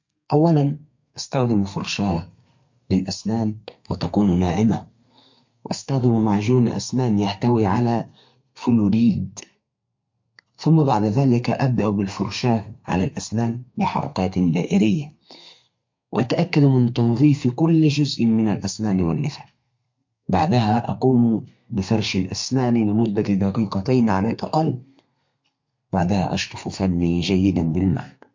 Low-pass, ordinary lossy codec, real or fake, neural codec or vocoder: 7.2 kHz; MP3, 48 kbps; fake; codec, 32 kHz, 1.9 kbps, SNAC